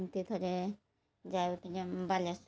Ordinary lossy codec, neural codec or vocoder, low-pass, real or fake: none; none; none; real